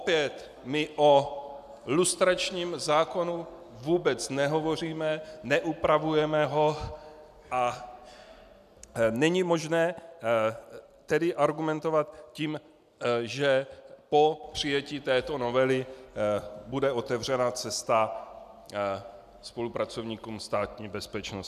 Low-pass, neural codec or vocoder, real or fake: 14.4 kHz; none; real